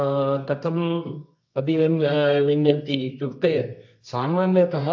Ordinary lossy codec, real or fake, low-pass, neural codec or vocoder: AAC, 48 kbps; fake; 7.2 kHz; codec, 24 kHz, 0.9 kbps, WavTokenizer, medium music audio release